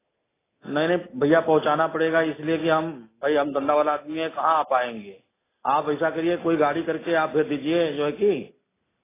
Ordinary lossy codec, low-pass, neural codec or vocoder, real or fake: AAC, 16 kbps; 3.6 kHz; none; real